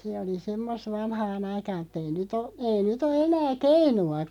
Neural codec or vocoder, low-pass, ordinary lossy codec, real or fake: none; 19.8 kHz; none; real